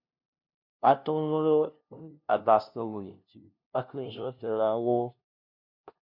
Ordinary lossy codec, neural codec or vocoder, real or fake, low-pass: MP3, 48 kbps; codec, 16 kHz, 0.5 kbps, FunCodec, trained on LibriTTS, 25 frames a second; fake; 5.4 kHz